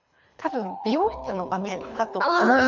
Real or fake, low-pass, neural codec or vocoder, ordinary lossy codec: fake; 7.2 kHz; codec, 24 kHz, 3 kbps, HILCodec; none